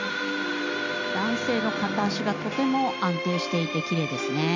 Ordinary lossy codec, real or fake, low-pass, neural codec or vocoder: MP3, 64 kbps; real; 7.2 kHz; none